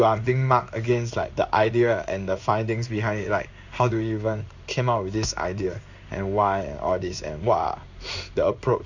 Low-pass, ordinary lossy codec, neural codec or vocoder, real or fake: 7.2 kHz; none; codec, 16 kHz in and 24 kHz out, 1 kbps, XY-Tokenizer; fake